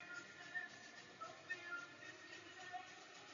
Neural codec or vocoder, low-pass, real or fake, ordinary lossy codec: none; 7.2 kHz; real; AAC, 48 kbps